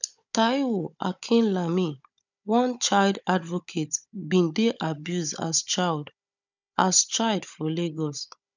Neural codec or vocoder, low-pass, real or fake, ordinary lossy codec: codec, 16 kHz, 16 kbps, FunCodec, trained on Chinese and English, 50 frames a second; 7.2 kHz; fake; none